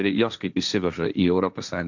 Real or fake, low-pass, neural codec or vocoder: fake; 7.2 kHz; codec, 16 kHz, 1.1 kbps, Voila-Tokenizer